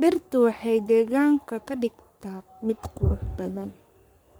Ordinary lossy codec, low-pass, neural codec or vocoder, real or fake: none; none; codec, 44.1 kHz, 3.4 kbps, Pupu-Codec; fake